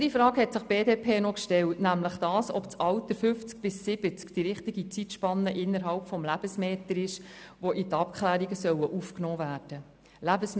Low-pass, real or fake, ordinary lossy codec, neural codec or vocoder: none; real; none; none